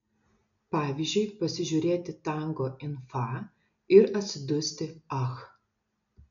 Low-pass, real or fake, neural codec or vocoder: 7.2 kHz; real; none